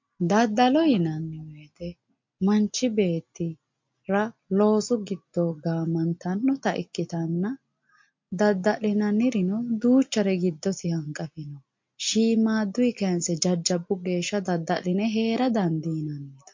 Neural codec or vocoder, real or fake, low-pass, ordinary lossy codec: none; real; 7.2 kHz; MP3, 48 kbps